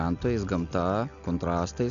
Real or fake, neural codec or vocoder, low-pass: real; none; 7.2 kHz